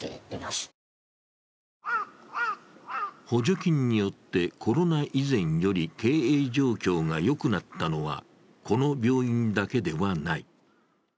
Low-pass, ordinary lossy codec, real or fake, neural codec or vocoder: none; none; real; none